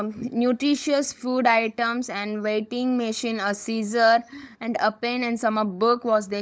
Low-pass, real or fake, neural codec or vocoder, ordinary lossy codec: none; fake; codec, 16 kHz, 16 kbps, FunCodec, trained on LibriTTS, 50 frames a second; none